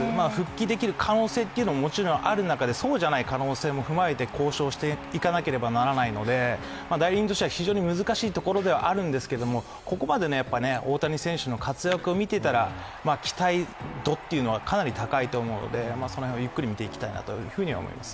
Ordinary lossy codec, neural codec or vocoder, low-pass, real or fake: none; none; none; real